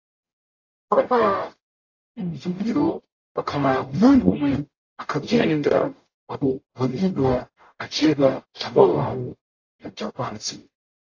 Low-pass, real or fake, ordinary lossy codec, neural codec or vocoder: 7.2 kHz; fake; AAC, 32 kbps; codec, 44.1 kHz, 0.9 kbps, DAC